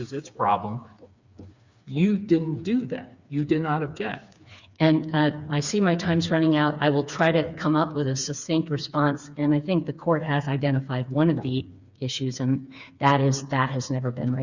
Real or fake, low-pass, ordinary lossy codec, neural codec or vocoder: fake; 7.2 kHz; Opus, 64 kbps; codec, 16 kHz, 4 kbps, FreqCodec, smaller model